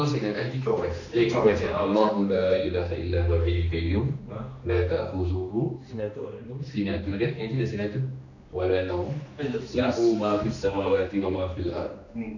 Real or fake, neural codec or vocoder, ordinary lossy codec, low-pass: fake; codec, 16 kHz, 2 kbps, X-Codec, HuBERT features, trained on general audio; none; 7.2 kHz